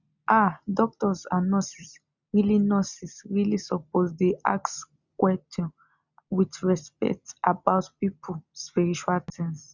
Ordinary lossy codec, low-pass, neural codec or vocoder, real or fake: none; 7.2 kHz; none; real